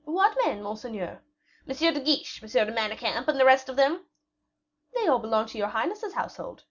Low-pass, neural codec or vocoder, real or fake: 7.2 kHz; none; real